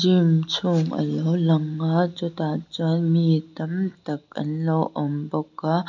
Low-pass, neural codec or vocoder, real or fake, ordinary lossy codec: 7.2 kHz; none; real; MP3, 64 kbps